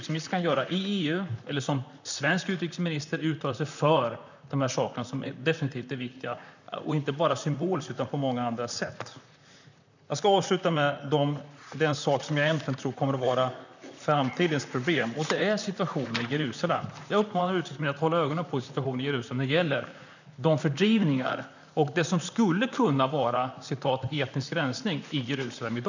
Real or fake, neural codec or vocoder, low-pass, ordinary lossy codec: fake; vocoder, 44.1 kHz, 128 mel bands, Pupu-Vocoder; 7.2 kHz; none